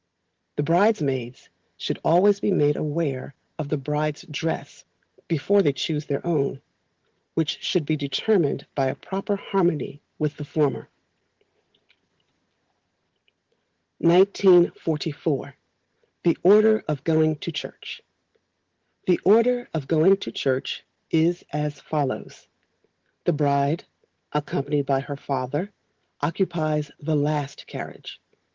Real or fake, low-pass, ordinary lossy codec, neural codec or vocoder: real; 7.2 kHz; Opus, 16 kbps; none